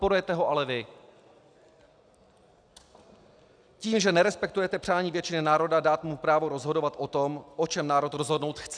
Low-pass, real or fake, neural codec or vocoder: 9.9 kHz; real; none